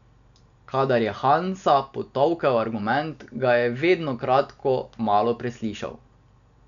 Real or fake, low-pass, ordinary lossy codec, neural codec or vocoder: real; 7.2 kHz; none; none